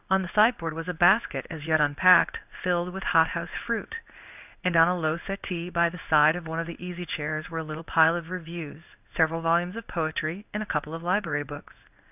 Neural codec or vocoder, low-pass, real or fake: codec, 16 kHz in and 24 kHz out, 1 kbps, XY-Tokenizer; 3.6 kHz; fake